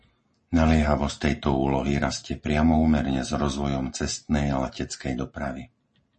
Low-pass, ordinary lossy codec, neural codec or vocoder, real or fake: 9.9 kHz; MP3, 32 kbps; none; real